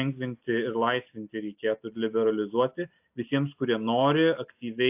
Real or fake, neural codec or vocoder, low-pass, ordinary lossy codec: real; none; 3.6 kHz; AAC, 32 kbps